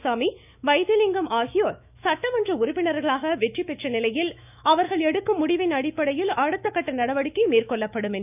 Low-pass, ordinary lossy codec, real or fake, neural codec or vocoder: 3.6 kHz; none; fake; autoencoder, 48 kHz, 128 numbers a frame, DAC-VAE, trained on Japanese speech